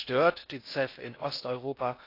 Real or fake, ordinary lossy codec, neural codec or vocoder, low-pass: fake; AAC, 32 kbps; codec, 16 kHz in and 24 kHz out, 0.6 kbps, FocalCodec, streaming, 4096 codes; 5.4 kHz